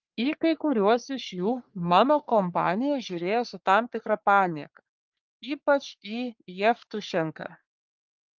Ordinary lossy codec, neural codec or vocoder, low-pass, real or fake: Opus, 24 kbps; codec, 44.1 kHz, 3.4 kbps, Pupu-Codec; 7.2 kHz; fake